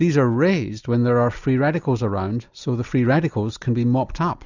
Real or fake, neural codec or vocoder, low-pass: real; none; 7.2 kHz